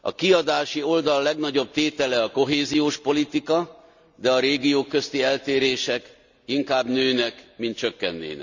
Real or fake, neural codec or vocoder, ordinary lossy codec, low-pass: real; none; none; 7.2 kHz